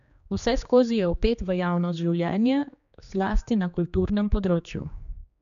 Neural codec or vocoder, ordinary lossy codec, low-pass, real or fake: codec, 16 kHz, 2 kbps, X-Codec, HuBERT features, trained on general audio; none; 7.2 kHz; fake